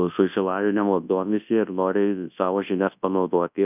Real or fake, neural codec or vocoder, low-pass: fake; codec, 24 kHz, 0.9 kbps, WavTokenizer, large speech release; 3.6 kHz